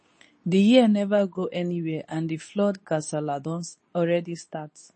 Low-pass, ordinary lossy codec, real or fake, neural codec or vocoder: 10.8 kHz; MP3, 32 kbps; fake; codec, 24 kHz, 0.9 kbps, WavTokenizer, medium speech release version 2